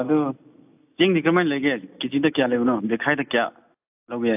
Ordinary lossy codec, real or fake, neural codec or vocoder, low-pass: AAC, 32 kbps; real; none; 3.6 kHz